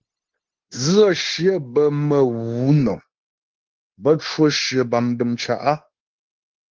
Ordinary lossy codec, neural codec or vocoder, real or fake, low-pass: Opus, 16 kbps; codec, 16 kHz, 0.9 kbps, LongCat-Audio-Codec; fake; 7.2 kHz